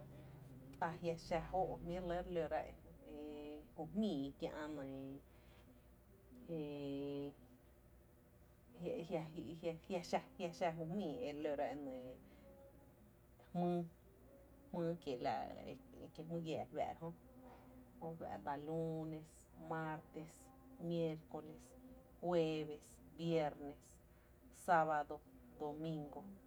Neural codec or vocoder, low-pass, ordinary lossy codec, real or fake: none; none; none; real